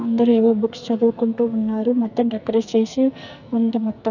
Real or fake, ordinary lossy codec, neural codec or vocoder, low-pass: fake; none; codec, 44.1 kHz, 2.6 kbps, SNAC; 7.2 kHz